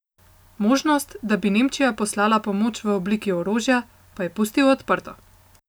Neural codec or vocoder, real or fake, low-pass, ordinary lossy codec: none; real; none; none